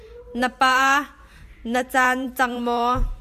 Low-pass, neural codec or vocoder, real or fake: 14.4 kHz; vocoder, 44.1 kHz, 128 mel bands every 512 samples, BigVGAN v2; fake